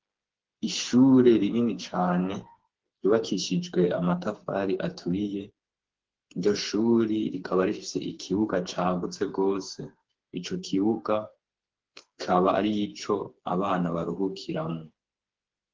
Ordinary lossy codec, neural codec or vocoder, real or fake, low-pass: Opus, 16 kbps; codec, 16 kHz, 4 kbps, FreqCodec, smaller model; fake; 7.2 kHz